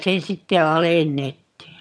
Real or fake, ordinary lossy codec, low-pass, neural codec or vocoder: fake; none; none; vocoder, 22.05 kHz, 80 mel bands, HiFi-GAN